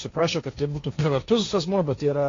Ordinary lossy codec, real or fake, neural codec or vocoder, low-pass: AAC, 32 kbps; fake; codec, 16 kHz, 1.1 kbps, Voila-Tokenizer; 7.2 kHz